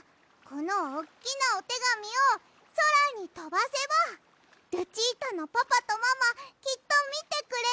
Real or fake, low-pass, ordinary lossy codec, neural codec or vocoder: real; none; none; none